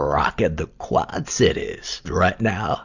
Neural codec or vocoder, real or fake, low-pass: none; real; 7.2 kHz